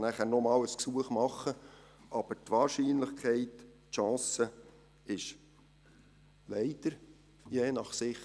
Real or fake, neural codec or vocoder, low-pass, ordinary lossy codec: real; none; none; none